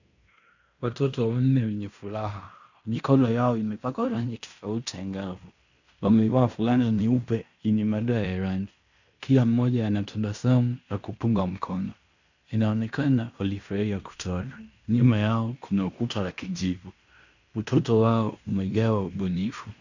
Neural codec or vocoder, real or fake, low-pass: codec, 16 kHz in and 24 kHz out, 0.9 kbps, LongCat-Audio-Codec, fine tuned four codebook decoder; fake; 7.2 kHz